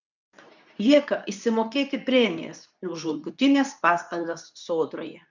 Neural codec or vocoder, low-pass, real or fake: codec, 24 kHz, 0.9 kbps, WavTokenizer, medium speech release version 2; 7.2 kHz; fake